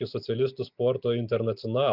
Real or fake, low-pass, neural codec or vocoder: real; 5.4 kHz; none